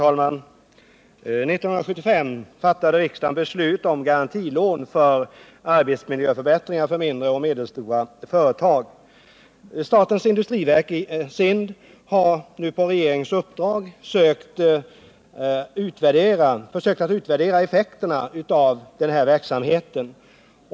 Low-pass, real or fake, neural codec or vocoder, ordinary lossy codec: none; real; none; none